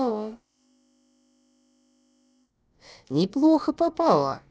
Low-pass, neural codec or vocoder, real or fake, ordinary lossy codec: none; codec, 16 kHz, about 1 kbps, DyCAST, with the encoder's durations; fake; none